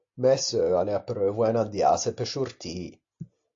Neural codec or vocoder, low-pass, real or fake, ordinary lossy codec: none; 7.2 kHz; real; AAC, 48 kbps